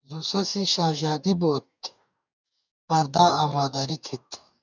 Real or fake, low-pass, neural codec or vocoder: fake; 7.2 kHz; codec, 44.1 kHz, 2.6 kbps, DAC